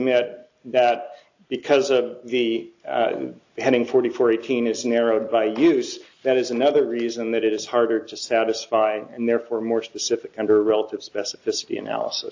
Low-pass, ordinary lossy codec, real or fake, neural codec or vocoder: 7.2 kHz; AAC, 48 kbps; real; none